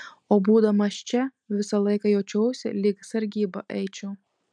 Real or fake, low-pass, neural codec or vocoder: real; 9.9 kHz; none